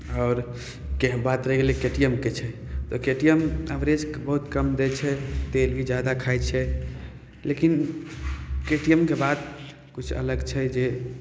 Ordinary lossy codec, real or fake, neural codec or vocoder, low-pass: none; real; none; none